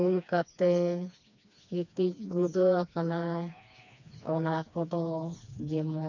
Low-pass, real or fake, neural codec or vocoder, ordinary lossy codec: 7.2 kHz; fake; codec, 16 kHz, 2 kbps, FreqCodec, smaller model; none